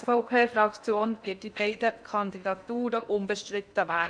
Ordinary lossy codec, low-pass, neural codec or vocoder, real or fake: none; 9.9 kHz; codec, 16 kHz in and 24 kHz out, 0.8 kbps, FocalCodec, streaming, 65536 codes; fake